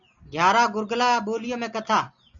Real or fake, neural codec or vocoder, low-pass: real; none; 7.2 kHz